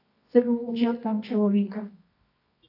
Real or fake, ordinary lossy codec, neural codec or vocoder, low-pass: fake; AAC, 32 kbps; codec, 24 kHz, 0.9 kbps, WavTokenizer, medium music audio release; 5.4 kHz